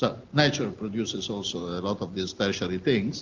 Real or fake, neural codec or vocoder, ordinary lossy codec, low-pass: real; none; Opus, 32 kbps; 7.2 kHz